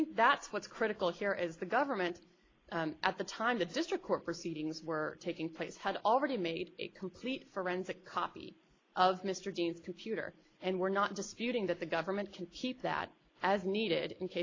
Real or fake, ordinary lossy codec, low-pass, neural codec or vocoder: real; AAC, 32 kbps; 7.2 kHz; none